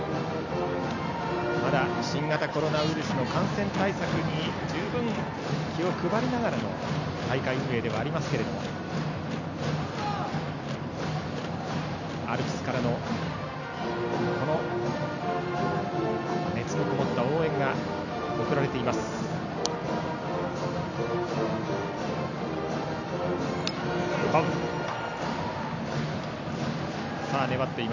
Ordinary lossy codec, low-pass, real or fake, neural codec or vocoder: none; 7.2 kHz; real; none